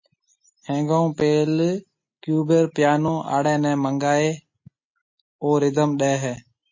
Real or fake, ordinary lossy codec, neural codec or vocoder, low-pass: real; MP3, 32 kbps; none; 7.2 kHz